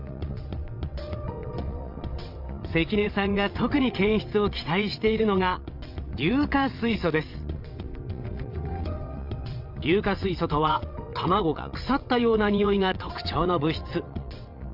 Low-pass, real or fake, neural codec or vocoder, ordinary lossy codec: 5.4 kHz; fake; vocoder, 22.05 kHz, 80 mel bands, WaveNeXt; none